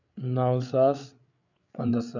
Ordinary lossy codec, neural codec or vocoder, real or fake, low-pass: none; codec, 16 kHz, 8 kbps, FreqCodec, larger model; fake; 7.2 kHz